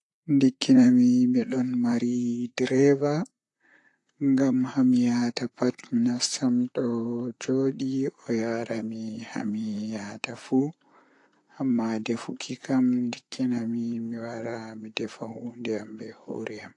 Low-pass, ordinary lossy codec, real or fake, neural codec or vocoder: 10.8 kHz; AAC, 48 kbps; fake; vocoder, 44.1 kHz, 128 mel bands, Pupu-Vocoder